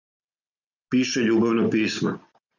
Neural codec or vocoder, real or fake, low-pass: none; real; 7.2 kHz